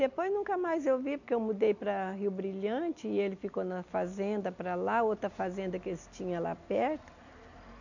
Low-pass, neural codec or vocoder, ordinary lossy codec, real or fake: 7.2 kHz; none; none; real